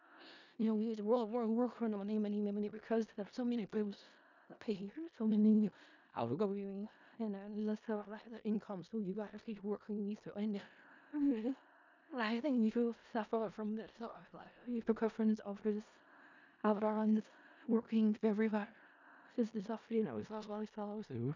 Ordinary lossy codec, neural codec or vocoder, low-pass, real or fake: none; codec, 16 kHz in and 24 kHz out, 0.4 kbps, LongCat-Audio-Codec, four codebook decoder; 7.2 kHz; fake